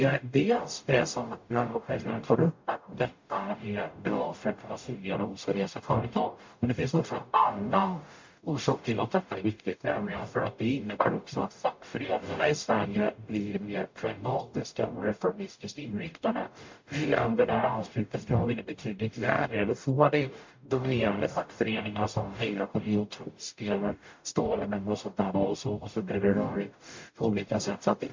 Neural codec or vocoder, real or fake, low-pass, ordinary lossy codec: codec, 44.1 kHz, 0.9 kbps, DAC; fake; 7.2 kHz; MP3, 48 kbps